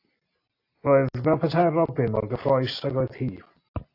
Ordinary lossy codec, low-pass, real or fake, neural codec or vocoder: AAC, 32 kbps; 5.4 kHz; real; none